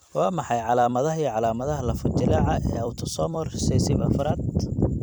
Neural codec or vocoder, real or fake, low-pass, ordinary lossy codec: vocoder, 44.1 kHz, 128 mel bands every 256 samples, BigVGAN v2; fake; none; none